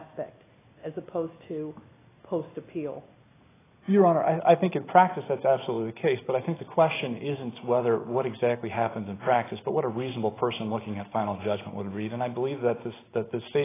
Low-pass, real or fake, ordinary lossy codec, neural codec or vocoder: 3.6 kHz; real; AAC, 16 kbps; none